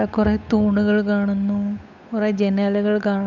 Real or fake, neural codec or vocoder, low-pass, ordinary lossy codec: fake; codec, 16 kHz, 8 kbps, FunCodec, trained on Chinese and English, 25 frames a second; 7.2 kHz; none